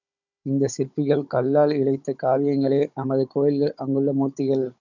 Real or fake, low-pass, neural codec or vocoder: fake; 7.2 kHz; codec, 16 kHz, 16 kbps, FunCodec, trained on Chinese and English, 50 frames a second